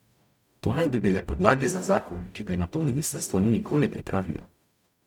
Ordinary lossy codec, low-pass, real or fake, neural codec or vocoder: none; 19.8 kHz; fake; codec, 44.1 kHz, 0.9 kbps, DAC